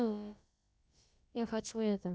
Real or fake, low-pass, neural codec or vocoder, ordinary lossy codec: fake; none; codec, 16 kHz, about 1 kbps, DyCAST, with the encoder's durations; none